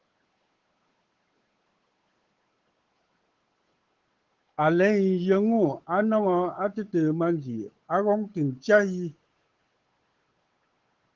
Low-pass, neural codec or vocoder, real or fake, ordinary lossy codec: 7.2 kHz; codec, 16 kHz, 8 kbps, FunCodec, trained on Chinese and English, 25 frames a second; fake; Opus, 16 kbps